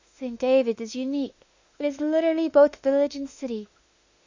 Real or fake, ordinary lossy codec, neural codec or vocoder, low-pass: fake; Opus, 64 kbps; autoencoder, 48 kHz, 32 numbers a frame, DAC-VAE, trained on Japanese speech; 7.2 kHz